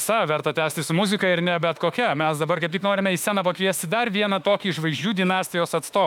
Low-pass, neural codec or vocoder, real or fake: 19.8 kHz; autoencoder, 48 kHz, 32 numbers a frame, DAC-VAE, trained on Japanese speech; fake